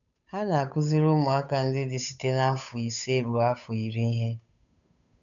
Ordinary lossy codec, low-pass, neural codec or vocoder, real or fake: none; 7.2 kHz; codec, 16 kHz, 8 kbps, FunCodec, trained on Chinese and English, 25 frames a second; fake